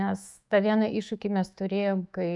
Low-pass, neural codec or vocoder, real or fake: 10.8 kHz; autoencoder, 48 kHz, 128 numbers a frame, DAC-VAE, trained on Japanese speech; fake